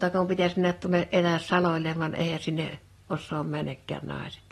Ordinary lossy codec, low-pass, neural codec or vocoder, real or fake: AAC, 32 kbps; 19.8 kHz; none; real